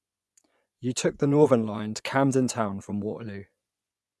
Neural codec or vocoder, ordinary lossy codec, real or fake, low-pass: vocoder, 24 kHz, 100 mel bands, Vocos; none; fake; none